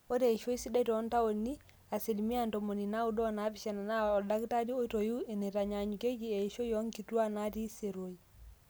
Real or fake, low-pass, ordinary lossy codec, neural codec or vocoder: real; none; none; none